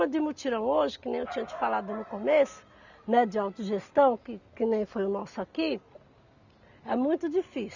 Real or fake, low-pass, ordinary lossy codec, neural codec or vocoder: real; 7.2 kHz; none; none